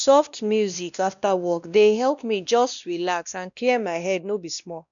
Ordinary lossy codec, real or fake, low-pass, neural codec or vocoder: none; fake; 7.2 kHz; codec, 16 kHz, 1 kbps, X-Codec, WavLM features, trained on Multilingual LibriSpeech